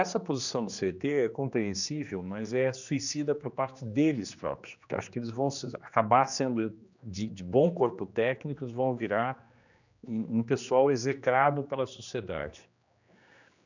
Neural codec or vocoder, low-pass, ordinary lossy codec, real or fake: codec, 16 kHz, 2 kbps, X-Codec, HuBERT features, trained on general audio; 7.2 kHz; none; fake